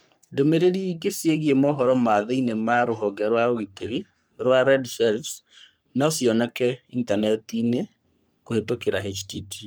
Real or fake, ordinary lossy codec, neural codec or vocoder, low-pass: fake; none; codec, 44.1 kHz, 3.4 kbps, Pupu-Codec; none